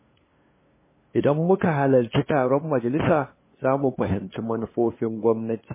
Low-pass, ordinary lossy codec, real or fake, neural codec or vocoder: 3.6 kHz; MP3, 16 kbps; fake; codec, 16 kHz, 2 kbps, FunCodec, trained on LibriTTS, 25 frames a second